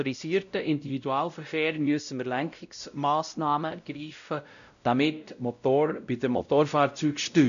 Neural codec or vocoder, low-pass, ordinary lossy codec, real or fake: codec, 16 kHz, 0.5 kbps, X-Codec, WavLM features, trained on Multilingual LibriSpeech; 7.2 kHz; none; fake